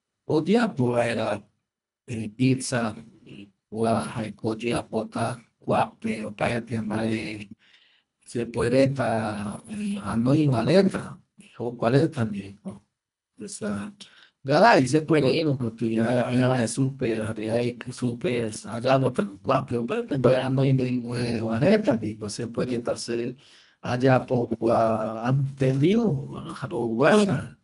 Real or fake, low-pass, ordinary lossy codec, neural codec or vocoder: fake; 10.8 kHz; none; codec, 24 kHz, 1.5 kbps, HILCodec